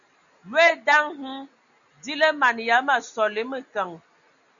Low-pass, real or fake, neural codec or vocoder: 7.2 kHz; real; none